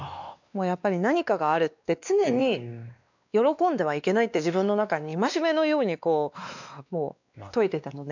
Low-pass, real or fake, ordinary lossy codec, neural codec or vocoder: 7.2 kHz; fake; none; codec, 16 kHz, 2 kbps, X-Codec, WavLM features, trained on Multilingual LibriSpeech